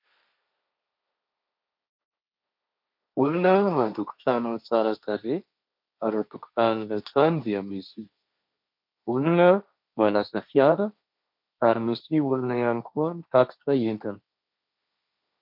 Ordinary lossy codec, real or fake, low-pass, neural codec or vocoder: MP3, 48 kbps; fake; 5.4 kHz; codec, 16 kHz, 1.1 kbps, Voila-Tokenizer